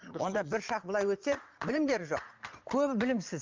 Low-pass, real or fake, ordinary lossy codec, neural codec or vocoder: 7.2 kHz; fake; Opus, 32 kbps; vocoder, 22.05 kHz, 80 mel bands, Vocos